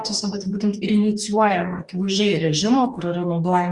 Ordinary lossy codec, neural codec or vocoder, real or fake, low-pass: Opus, 64 kbps; codec, 44.1 kHz, 2.6 kbps, DAC; fake; 10.8 kHz